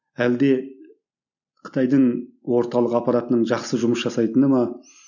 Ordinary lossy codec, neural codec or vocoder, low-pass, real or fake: none; none; 7.2 kHz; real